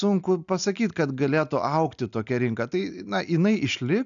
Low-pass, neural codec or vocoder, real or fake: 7.2 kHz; none; real